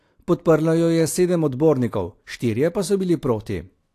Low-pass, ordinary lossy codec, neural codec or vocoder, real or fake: 14.4 kHz; AAC, 64 kbps; none; real